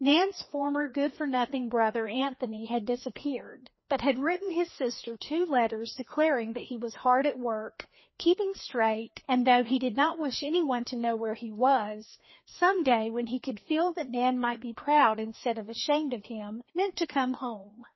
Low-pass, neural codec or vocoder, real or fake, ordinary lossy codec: 7.2 kHz; codec, 16 kHz, 2 kbps, FreqCodec, larger model; fake; MP3, 24 kbps